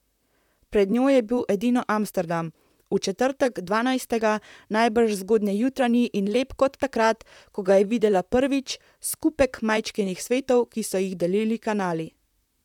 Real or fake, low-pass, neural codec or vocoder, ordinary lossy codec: fake; 19.8 kHz; vocoder, 44.1 kHz, 128 mel bands, Pupu-Vocoder; none